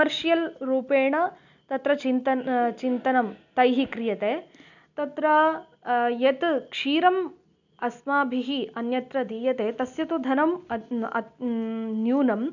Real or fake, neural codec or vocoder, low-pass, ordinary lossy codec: real; none; 7.2 kHz; none